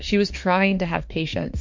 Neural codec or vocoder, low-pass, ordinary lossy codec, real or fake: autoencoder, 48 kHz, 32 numbers a frame, DAC-VAE, trained on Japanese speech; 7.2 kHz; MP3, 48 kbps; fake